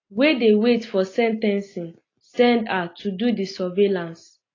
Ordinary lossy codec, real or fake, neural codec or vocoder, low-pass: AAC, 32 kbps; real; none; 7.2 kHz